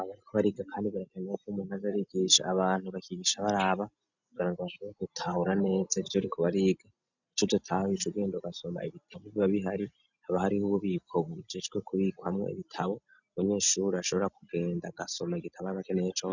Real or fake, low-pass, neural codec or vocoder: real; 7.2 kHz; none